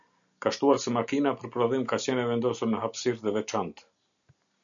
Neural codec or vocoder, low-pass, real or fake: none; 7.2 kHz; real